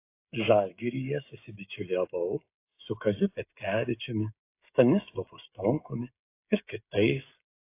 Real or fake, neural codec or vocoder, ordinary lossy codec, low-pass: fake; codec, 16 kHz, 16 kbps, FreqCodec, smaller model; AAC, 24 kbps; 3.6 kHz